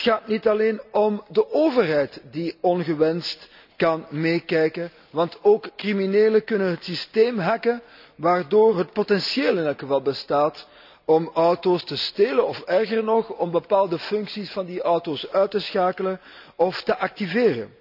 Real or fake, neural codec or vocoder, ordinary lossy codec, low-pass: real; none; none; 5.4 kHz